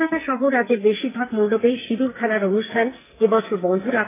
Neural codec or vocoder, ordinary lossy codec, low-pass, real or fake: codec, 44.1 kHz, 2.6 kbps, SNAC; AAC, 16 kbps; 3.6 kHz; fake